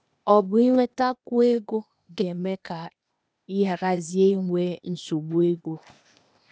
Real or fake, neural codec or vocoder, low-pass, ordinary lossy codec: fake; codec, 16 kHz, 0.8 kbps, ZipCodec; none; none